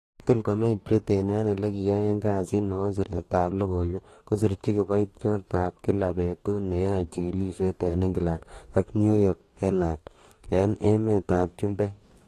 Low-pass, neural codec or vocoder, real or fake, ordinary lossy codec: 14.4 kHz; codec, 44.1 kHz, 2.6 kbps, DAC; fake; AAC, 48 kbps